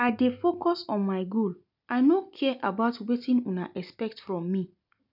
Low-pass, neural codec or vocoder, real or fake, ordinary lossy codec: 5.4 kHz; none; real; none